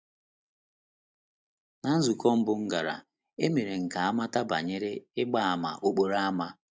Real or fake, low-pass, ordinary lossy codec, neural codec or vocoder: real; none; none; none